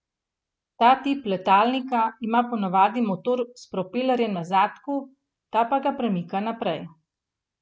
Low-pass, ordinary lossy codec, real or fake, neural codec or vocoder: none; none; real; none